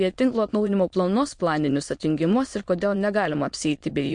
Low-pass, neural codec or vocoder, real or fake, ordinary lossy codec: 9.9 kHz; autoencoder, 22.05 kHz, a latent of 192 numbers a frame, VITS, trained on many speakers; fake; MP3, 48 kbps